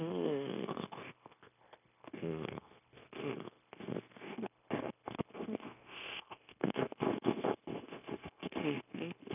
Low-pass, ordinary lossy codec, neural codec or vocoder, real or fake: 3.6 kHz; none; codec, 16 kHz, 0.9 kbps, LongCat-Audio-Codec; fake